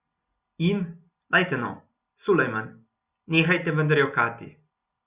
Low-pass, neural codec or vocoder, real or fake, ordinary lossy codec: 3.6 kHz; none; real; Opus, 64 kbps